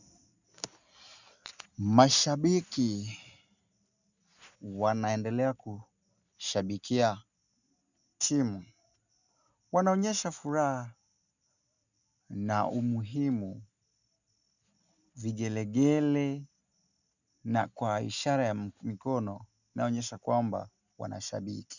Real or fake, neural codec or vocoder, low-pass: real; none; 7.2 kHz